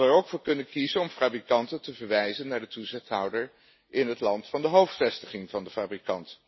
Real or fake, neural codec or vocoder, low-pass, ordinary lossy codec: real; none; 7.2 kHz; MP3, 24 kbps